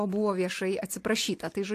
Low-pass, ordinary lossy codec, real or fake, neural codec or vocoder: 14.4 kHz; AAC, 64 kbps; real; none